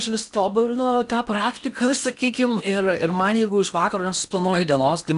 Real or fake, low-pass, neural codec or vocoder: fake; 10.8 kHz; codec, 16 kHz in and 24 kHz out, 0.8 kbps, FocalCodec, streaming, 65536 codes